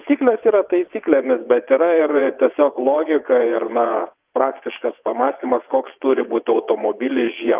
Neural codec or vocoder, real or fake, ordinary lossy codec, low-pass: vocoder, 22.05 kHz, 80 mel bands, WaveNeXt; fake; Opus, 24 kbps; 3.6 kHz